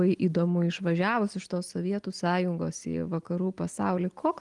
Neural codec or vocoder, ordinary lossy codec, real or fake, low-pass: none; Opus, 24 kbps; real; 10.8 kHz